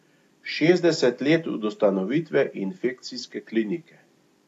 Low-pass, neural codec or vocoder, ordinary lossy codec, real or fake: 14.4 kHz; none; AAC, 64 kbps; real